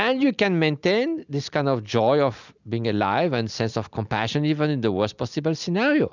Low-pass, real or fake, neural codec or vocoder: 7.2 kHz; real; none